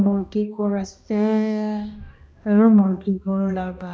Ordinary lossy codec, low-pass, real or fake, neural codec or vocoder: none; none; fake; codec, 16 kHz, 1 kbps, X-Codec, HuBERT features, trained on balanced general audio